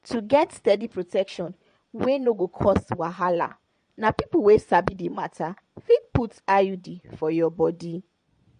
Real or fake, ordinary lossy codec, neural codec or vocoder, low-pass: fake; MP3, 48 kbps; vocoder, 22.05 kHz, 80 mel bands, Vocos; 9.9 kHz